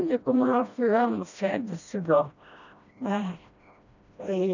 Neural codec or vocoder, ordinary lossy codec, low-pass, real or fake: codec, 16 kHz, 1 kbps, FreqCodec, smaller model; none; 7.2 kHz; fake